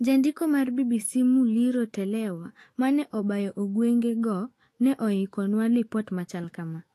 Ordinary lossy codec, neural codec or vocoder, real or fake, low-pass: AAC, 48 kbps; autoencoder, 48 kHz, 128 numbers a frame, DAC-VAE, trained on Japanese speech; fake; 14.4 kHz